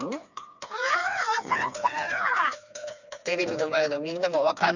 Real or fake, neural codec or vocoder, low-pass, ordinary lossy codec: fake; codec, 16 kHz, 2 kbps, FreqCodec, smaller model; 7.2 kHz; none